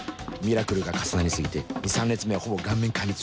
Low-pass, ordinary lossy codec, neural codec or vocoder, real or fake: none; none; none; real